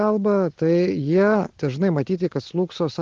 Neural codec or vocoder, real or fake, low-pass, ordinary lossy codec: none; real; 7.2 kHz; Opus, 16 kbps